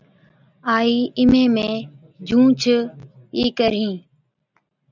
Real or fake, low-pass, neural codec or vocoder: real; 7.2 kHz; none